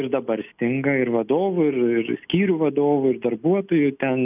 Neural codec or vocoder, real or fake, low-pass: none; real; 3.6 kHz